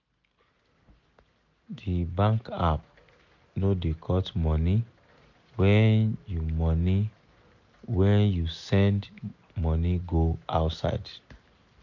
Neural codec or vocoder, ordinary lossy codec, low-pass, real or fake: none; none; 7.2 kHz; real